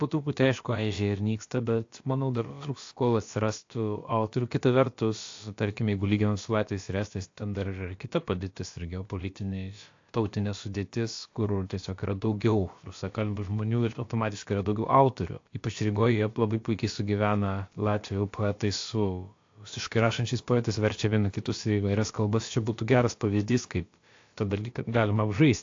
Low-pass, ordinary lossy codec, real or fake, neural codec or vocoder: 7.2 kHz; AAC, 48 kbps; fake; codec, 16 kHz, about 1 kbps, DyCAST, with the encoder's durations